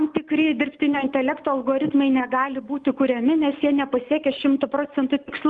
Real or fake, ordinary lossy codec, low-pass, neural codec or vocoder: real; Opus, 32 kbps; 10.8 kHz; none